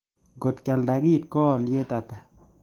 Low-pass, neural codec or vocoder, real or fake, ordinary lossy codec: 19.8 kHz; codec, 44.1 kHz, 7.8 kbps, DAC; fake; Opus, 24 kbps